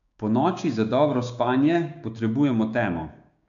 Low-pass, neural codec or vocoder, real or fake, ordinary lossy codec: 7.2 kHz; codec, 16 kHz, 6 kbps, DAC; fake; none